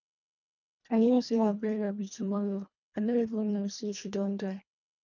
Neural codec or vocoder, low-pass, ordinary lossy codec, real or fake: codec, 24 kHz, 1.5 kbps, HILCodec; 7.2 kHz; none; fake